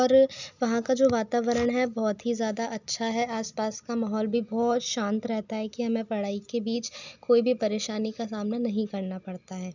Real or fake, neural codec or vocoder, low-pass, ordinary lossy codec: real; none; 7.2 kHz; none